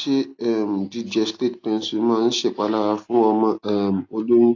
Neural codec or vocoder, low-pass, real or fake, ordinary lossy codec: none; 7.2 kHz; real; AAC, 48 kbps